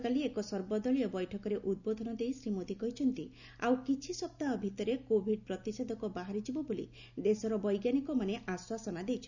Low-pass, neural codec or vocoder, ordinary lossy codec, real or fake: 7.2 kHz; none; none; real